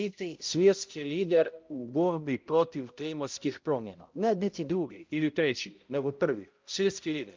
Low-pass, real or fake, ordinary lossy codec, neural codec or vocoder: 7.2 kHz; fake; Opus, 24 kbps; codec, 16 kHz, 0.5 kbps, X-Codec, HuBERT features, trained on balanced general audio